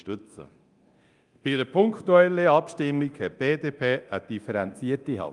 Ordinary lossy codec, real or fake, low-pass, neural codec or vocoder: Opus, 32 kbps; fake; 10.8 kHz; codec, 24 kHz, 0.9 kbps, DualCodec